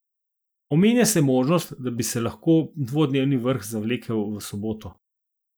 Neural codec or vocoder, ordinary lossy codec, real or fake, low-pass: none; none; real; none